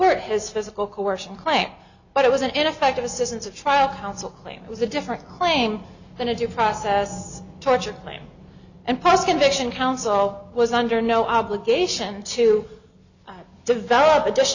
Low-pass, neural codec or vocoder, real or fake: 7.2 kHz; none; real